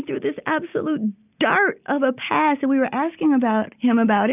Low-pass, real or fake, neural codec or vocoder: 3.6 kHz; real; none